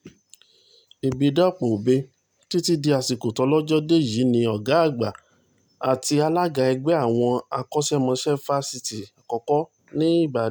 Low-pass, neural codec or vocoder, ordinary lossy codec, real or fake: none; none; none; real